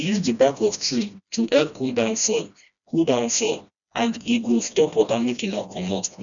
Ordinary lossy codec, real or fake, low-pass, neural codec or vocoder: none; fake; 7.2 kHz; codec, 16 kHz, 1 kbps, FreqCodec, smaller model